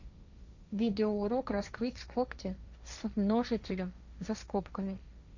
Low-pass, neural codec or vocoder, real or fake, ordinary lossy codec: 7.2 kHz; codec, 16 kHz, 1.1 kbps, Voila-Tokenizer; fake; none